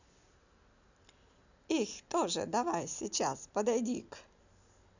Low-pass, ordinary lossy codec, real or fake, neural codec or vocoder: 7.2 kHz; none; real; none